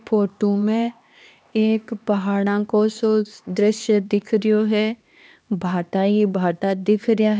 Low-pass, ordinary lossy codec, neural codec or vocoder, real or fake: none; none; codec, 16 kHz, 2 kbps, X-Codec, HuBERT features, trained on LibriSpeech; fake